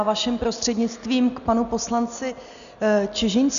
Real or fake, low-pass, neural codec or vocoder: real; 7.2 kHz; none